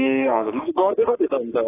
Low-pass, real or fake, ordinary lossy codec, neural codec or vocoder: 3.6 kHz; fake; AAC, 16 kbps; codec, 44.1 kHz, 3.4 kbps, Pupu-Codec